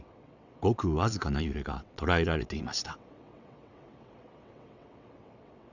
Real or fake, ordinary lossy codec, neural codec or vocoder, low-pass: fake; none; vocoder, 22.05 kHz, 80 mel bands, WaveNeXt; 7.2 kHz